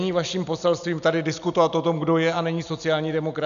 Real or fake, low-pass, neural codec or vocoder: real; 7.2 kHz; none